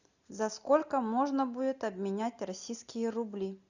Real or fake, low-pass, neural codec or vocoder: real; 7.2 kHz; none